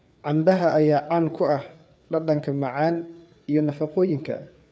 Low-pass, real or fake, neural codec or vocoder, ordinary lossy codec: none; fake; codec, 16 kHz, 4 kbps, FreqCodec, larger model; none